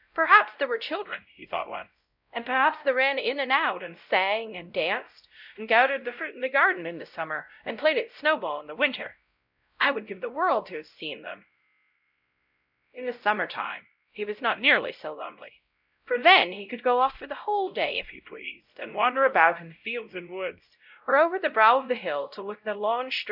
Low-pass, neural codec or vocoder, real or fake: 5.4 kHz; codec, 16 kHz, 0.5 kbps, X-Codec, WavLM features, trained on Multilingual LibriSpeech; fake